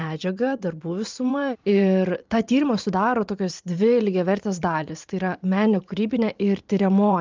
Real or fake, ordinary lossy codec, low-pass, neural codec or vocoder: fake; Opus, 24 kbps; 7.2 kHz; vocoder, 44.1 kHz, 128 mel bands every 512 samples, BigVGAN v2